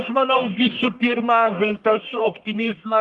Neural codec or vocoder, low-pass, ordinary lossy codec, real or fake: codec, 44.1 kHz, 1.7 kbps, Pupu-Codec; 10.8 kHz; Opus, 24 kbps; fake